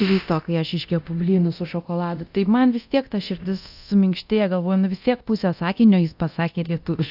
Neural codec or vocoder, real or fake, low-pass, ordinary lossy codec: codec, 24 kHz, 0.9 kbps, DualCodec; fake; 5.4 kHz; AAC, 48 kbps